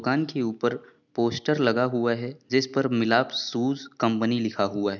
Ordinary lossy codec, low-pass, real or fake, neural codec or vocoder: none; 7.2 kHz; real; none